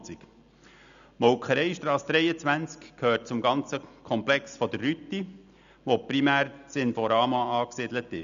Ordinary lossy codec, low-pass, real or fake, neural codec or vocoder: none; 7.2 kHz; real; none